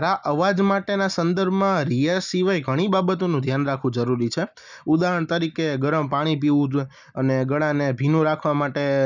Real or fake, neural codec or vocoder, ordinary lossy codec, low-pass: real; none; none; 7.2 kHz